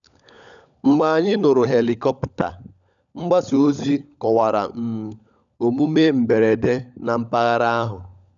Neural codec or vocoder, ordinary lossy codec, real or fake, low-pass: codec, 16 kHz, 16 kbps, FunCodec, trained on LibriTTS, 50 frames a second; none; fake; 7.2 kHz